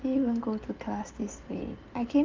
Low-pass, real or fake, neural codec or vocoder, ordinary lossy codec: 7.2 kHz; real; none; Opus, 32 kbps